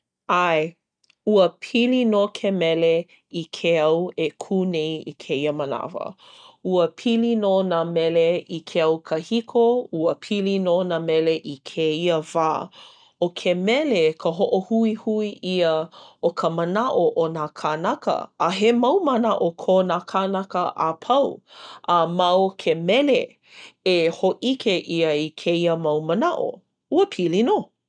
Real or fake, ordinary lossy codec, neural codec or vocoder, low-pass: real; none; none; 9.9 kHz